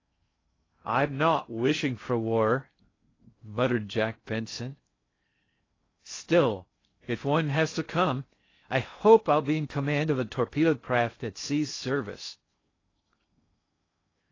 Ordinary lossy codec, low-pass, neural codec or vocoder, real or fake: AAC, 32 kbps; 7.2 kHz; codec, 16 kHz in and 24 kHz out, 0.6 kbps, FocalCodec, streaming, 2048 codes; fake